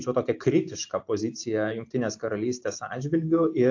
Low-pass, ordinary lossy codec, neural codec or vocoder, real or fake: 7.2 kHz; AAC, 48 kbps; none; real